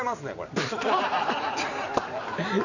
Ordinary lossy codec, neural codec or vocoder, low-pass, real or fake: none; vocoder, 44.1 kHz, 128 mel bands every 256 samples, BigVGAN v2; 7.2 kHz; fake